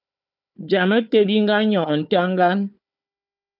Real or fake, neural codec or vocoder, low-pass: fake; codec, 16 kHz, 4 kbps, FunCodec, trained on Chinese and English, 50 frames a second; 5.4 kHz